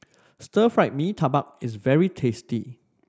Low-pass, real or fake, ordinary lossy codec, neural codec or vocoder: none; real; none; none